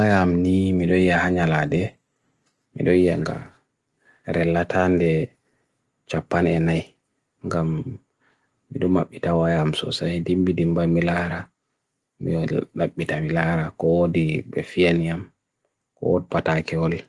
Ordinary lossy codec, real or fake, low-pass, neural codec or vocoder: Opus, 24 kbps; real; 10.8 kHz; none